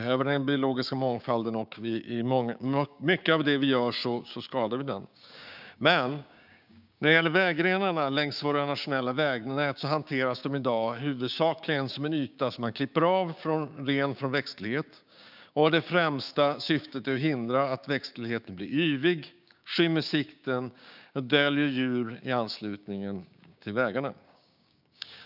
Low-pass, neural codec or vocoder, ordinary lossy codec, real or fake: 5.4 kHz; codec, 16 kHz, 6 kbps, DAC; none; fake